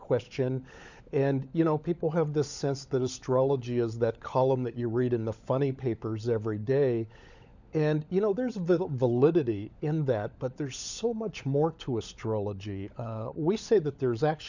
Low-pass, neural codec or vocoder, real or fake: 7.2 kHz; codec, 16 kHz, 16 kbps, FunCodec, trained on LibriTTS, 50 frames a second; fake